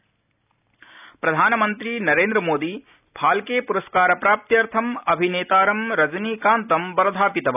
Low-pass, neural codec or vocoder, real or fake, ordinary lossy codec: 3.6 kHz; none; real; none